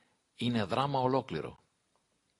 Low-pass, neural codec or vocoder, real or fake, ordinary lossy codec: 10.8 kHz; none; real; AAC, 48 kbps